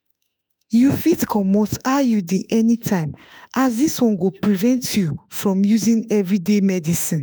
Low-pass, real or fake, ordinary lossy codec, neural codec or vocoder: none; fake; none; autoencoder, 48 kHz, 32 numbers a frame, DAC-VAE, trained on Japanese speech